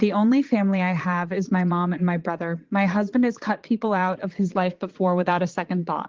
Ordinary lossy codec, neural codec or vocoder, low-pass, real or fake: Opus, 16 kbps; vocoder, 22.05 kHz, 80 mel bands, Vocos; 7.2 kHz; fake